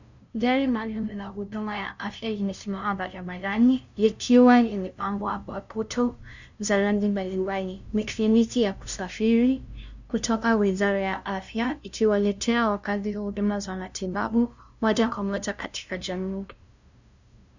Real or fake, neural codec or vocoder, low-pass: fake; codec, 16 kHz, 0.5 kbps, FunCodec, trained on LibriTTS, 25 frames a second; 7.2 kHz